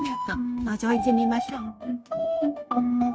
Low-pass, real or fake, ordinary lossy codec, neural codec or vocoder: none; fake; none; codec, 16 kHz, 0.9 kbps, LongCat-Audio-Codec